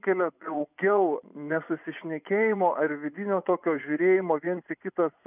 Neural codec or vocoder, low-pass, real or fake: vocoder, 24 kHz, 100 mel bands, Vocos; 3.6 kHz; fake